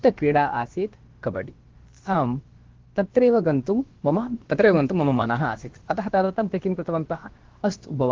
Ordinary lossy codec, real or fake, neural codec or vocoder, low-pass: Opus, 16 kbps; fake; codec, 16 kHz, about 1 kbps, DyCAST, with the encoder's durations; 7.2 kHz